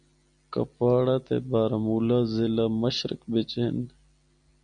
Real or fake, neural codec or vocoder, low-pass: real; none; 9.9 kHz